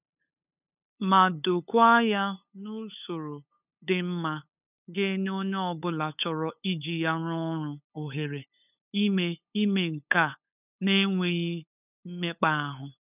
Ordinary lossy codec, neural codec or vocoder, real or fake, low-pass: none; codec, 16 kHz, 8 kbps, FunCodec, trained on LibriTTS, 25 frames a second; fake; 3.6 kHz